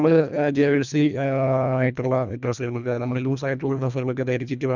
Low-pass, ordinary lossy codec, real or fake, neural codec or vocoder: 7.2 kHz; none; fake; codec, 24 kHz, 1.5 kbps, HILCodec